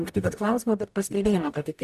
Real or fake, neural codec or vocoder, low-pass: fake; codec, 44.1 kHz, 0.9 kbps, DAC; 14.4 kHz